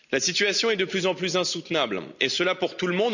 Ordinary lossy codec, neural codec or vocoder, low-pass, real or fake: none; none; 7.2 kHz; real